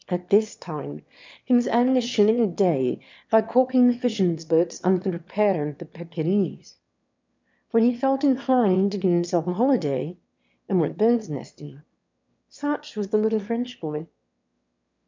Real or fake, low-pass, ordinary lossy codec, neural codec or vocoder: fake; 7.2 kHz; MP3, 64 kbps; autoencoder, 22.05 kHz, a latent of 192 numbers a frame, VITS, trained on one speaker